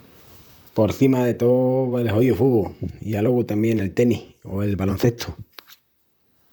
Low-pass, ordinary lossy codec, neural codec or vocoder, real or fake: none; none; vocoder, 44.1 kHz, 128 mel bands, Pupu-Vocoder; fake